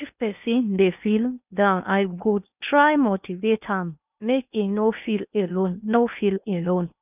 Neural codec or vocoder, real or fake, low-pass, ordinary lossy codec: codec, 16 kHz in and 24 kHz out, 0.8 kbps, FocalCodec, streaming, 65536 codes; fake; 3.6 kHz; none